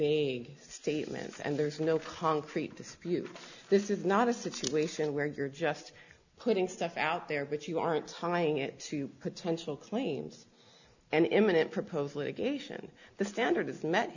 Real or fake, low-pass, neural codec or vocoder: real; 7.2 kHz; none